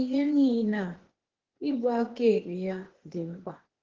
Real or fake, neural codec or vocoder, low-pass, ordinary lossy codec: fake; codec, 16 kHz, 0.8 kbps, ZipCodec; 7.2 kHz; Opus, 16 kbps